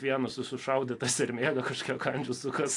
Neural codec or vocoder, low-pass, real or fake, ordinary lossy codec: none; 10.8 kHz; real; AAC, 48 kbps